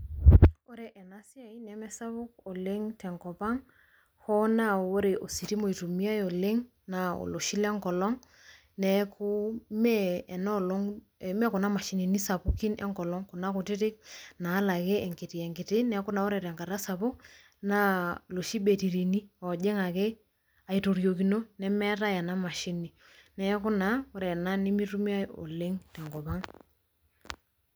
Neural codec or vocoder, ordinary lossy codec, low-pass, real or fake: none; none; none; real